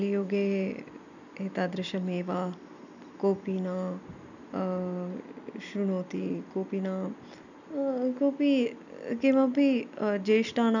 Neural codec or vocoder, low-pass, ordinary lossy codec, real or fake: none; 7.2 kHz; none; real